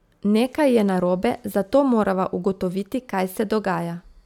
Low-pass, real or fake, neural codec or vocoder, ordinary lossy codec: 19.8 kHz; real; none; none